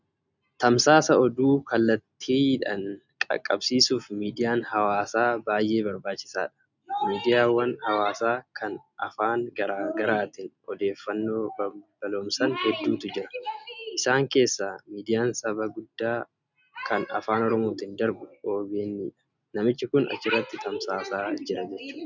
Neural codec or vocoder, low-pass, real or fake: none; 7.2 kHz; real